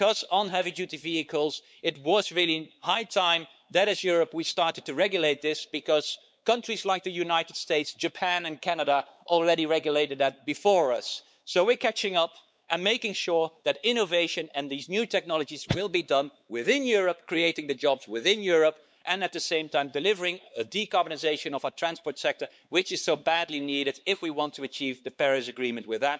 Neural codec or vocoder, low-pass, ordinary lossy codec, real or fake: codec, 16 kHz, 4 kbps, X-Codec, WavLM features, trained on Multilingual LibriSpeech; none; none; fake